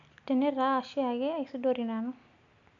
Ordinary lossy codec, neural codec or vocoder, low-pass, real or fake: none; none; 7.2 kHz; real